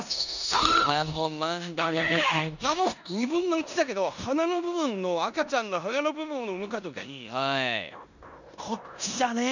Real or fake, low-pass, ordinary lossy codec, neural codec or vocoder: fake; 7.2 kHz; none; codec, 16 kHz in and 24 kHz out, 0.9 kbps, LongCat-Audio-Codec, four codebook decoder